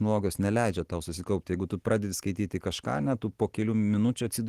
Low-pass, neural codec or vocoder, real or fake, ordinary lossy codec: 14.4 kHz; none; real; Opus, 16 kbps